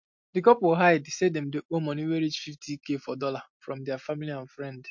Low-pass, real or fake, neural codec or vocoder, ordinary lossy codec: 7.2 kHz; real; none; MP3, 48 kbps